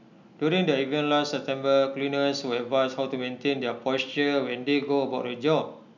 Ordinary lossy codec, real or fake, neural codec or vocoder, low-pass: none; real; none; 7.2 kHz